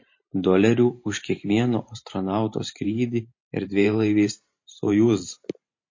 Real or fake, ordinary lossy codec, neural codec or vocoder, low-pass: fake; MP3, 32 kbps; vocoder, 44.1 kHz, 128 mel bands every 512 samples, BigVGAN v2; 7.2 kHz